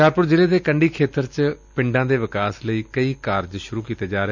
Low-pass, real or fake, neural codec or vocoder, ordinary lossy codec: 7.2 kHz; real; none; none